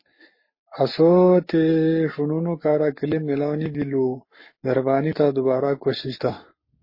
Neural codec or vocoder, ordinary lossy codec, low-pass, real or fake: codec, 44.1 kHz, 7.8 kbps, Pupu-Codec; MP3, 24 kbps; 5.4 kHz; fake